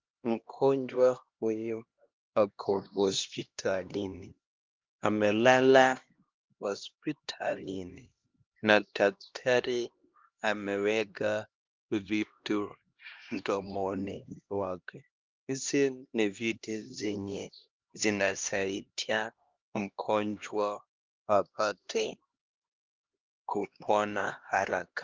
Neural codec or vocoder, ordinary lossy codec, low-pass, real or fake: codec, 16 kHz, 1 kbps, X-Codec, HuBERT features, trained on LibriSpeech; Opus, 32 kbps; 7.2 kHz; fake